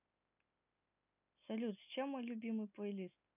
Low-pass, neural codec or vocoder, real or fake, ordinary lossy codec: 3.6 kHz; none; real; none